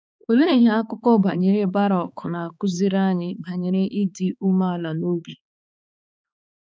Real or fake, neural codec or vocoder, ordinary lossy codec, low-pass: fake; codec, 16 kHz, 4 kbps, X-Codec, HuBERT features, trained on balanced general audio; none; none